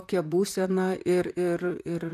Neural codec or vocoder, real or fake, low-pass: vocoder, 44.1 kHz, 128 mel bands, Pupu-Vocoder; fake; 14.4 kHz